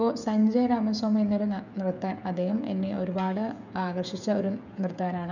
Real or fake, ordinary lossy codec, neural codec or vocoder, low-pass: fake; none; codec, 16 kHz, 16 kbps, FreqCodec, smaller model; 7.2 kHz